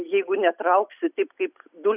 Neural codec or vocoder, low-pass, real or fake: none; 3.6 kHz; real